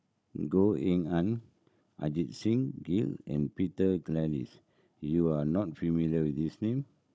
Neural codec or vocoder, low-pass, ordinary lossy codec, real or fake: codec, 16 kHz, 16 kbps, FunCodec, trained on Chinese and English, 50 frames a second; none; none; fake